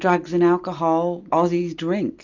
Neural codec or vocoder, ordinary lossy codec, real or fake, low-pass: none; Opus, 64 kbps; real; 7.2 kHz